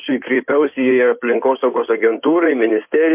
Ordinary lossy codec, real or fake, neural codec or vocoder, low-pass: MP3, 32 kbps; fake; codec, 16 kHz in and 24 kHz out, 2.2 kbps, FireRedTTS-2 codec; 3.6 kHz